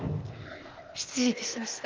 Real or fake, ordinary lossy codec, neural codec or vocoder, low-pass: fake; Opus, 32 kbps; codec, 16 kHz, 0.8 kbps, ZipCodec; 7.2 kHz